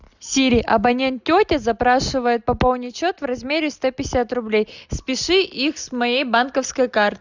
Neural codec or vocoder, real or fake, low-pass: none; real; 7.2 kHz